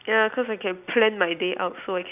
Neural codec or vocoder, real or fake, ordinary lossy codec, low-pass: none; real; none; 3.6 kHz